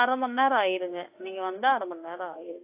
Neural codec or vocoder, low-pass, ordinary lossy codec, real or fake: autoencoder, 48 kHz, 32 numbers a frame, DAC-VAE, trained on Japanese speech; 3.6 kHz; AAC, 24 kbps; fake